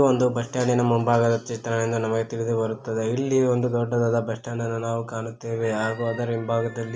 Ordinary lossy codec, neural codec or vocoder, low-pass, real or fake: none; none; none; real